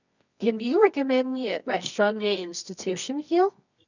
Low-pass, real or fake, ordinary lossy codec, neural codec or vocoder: 7.2 kHz; fake; none; codec, 24 kHz, 0.9 kbps, WavTokenizer, medium music audio release